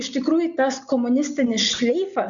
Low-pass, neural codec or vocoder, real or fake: 7.2 kHz; none; real